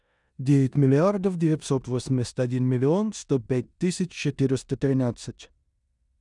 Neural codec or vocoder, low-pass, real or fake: codec, 16 kHz in and 24 kHz out, 0.9 kbps, LongCat-Audio-Codec, four codebook decoder; 10.8 kHz; fake